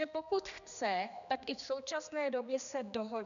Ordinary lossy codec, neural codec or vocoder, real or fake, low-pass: MP3, 96 kbps; codec, 16 kHz, 2 kbps, X-Codec, HuBERT features, trained on general audio; fake; 7.2 kHz